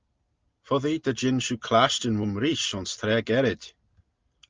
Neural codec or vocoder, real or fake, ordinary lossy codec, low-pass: none; real; Opus, 24 kbps; 7.2 kHz